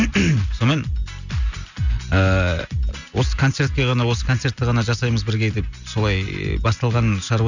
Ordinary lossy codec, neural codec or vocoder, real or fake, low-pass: none; none; real; 7.2 kHz